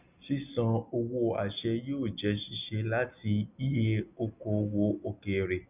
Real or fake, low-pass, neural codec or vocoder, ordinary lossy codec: real; 3.6 kHz; none; none